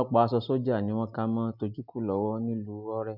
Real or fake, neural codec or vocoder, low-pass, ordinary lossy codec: real; none; 5.4 kHz; none